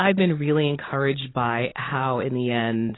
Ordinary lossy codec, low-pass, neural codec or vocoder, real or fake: AAC, 16 kbps; 7.2 kHz; none; real